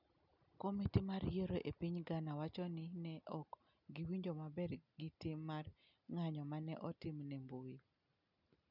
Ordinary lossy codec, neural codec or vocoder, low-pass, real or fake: none; none; 5.4 kHz; real